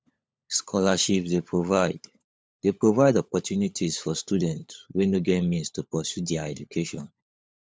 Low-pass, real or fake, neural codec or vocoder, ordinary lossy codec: none; fake; codec, 16 kHz, 16 kbps, FunCodec, trained on LibriTTS, 50 frames a second; none